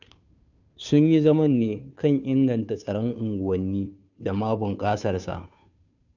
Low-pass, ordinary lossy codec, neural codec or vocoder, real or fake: 7.2 kHz; none; codec, 16 kHz, 2 kbps, FunCodec, trained on Chinese and English, 25 frames a second; fake